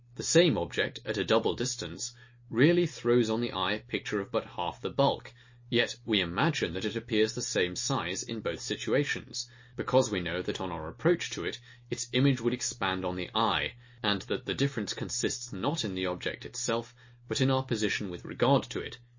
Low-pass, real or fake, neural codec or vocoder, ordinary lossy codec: 7.2 kHz; real; none; MP3, 32 kbps